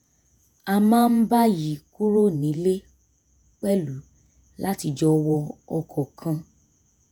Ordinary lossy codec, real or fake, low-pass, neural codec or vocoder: none; fake; none; vocoder, 48 kHz, 128 mel bands, Vocos